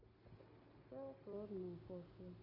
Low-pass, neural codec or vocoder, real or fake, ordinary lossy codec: 5.4 kHz; none; real; MP3, 32 kbps